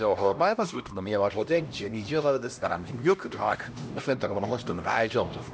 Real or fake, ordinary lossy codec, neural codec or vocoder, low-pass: fake; none; codec, 16 kHz, 1 kbps, X-Codec, HuBERT features, trained on LibriSpeech; none